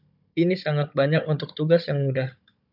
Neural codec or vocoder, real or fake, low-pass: codec, 16 kHz, 16 kbps, FunCodec, trained on Chinese and English, 50 frames a second; fake; 5.4 kHz